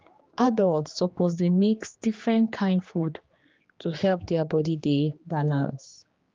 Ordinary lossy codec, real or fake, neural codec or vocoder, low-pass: Opus, 24 kbps; fake; codec, 16 kHz, 2 kbps, X-Codec, HuBERT features, trained on general audio; 7.2 kHz